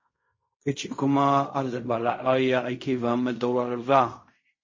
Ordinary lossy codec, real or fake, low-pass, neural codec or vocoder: MP3, 32 kbps; fake; 7.2 kHz; codec, 16 kHz in and 24 kHz out, 0.4 kbps, LongCat-Audio-Codec, fine tuned four codebook decoder